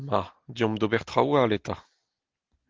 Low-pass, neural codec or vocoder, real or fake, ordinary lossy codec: 7.2 kHz; none; real; Opus, 16 kbps